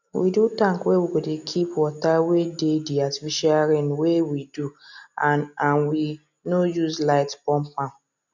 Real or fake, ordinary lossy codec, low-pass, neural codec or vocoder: real; none; 7.2 kHz; none